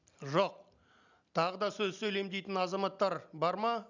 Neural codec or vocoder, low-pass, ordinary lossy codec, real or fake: none; 7.2 kHz; none; real